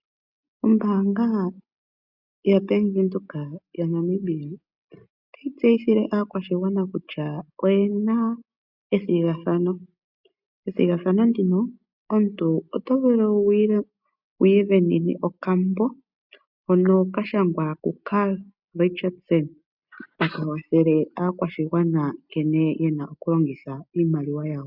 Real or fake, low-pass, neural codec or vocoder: real; 5.4 kHz; none